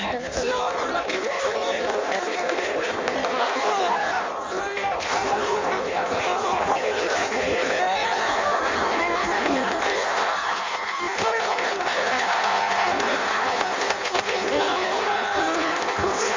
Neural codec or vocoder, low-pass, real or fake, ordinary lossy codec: codec, 16 kHz in and 24 kHz out, 0.6 kbps, FireRedTTS-2 codec; 7.2 kHz; fake; MP3, 48 kbps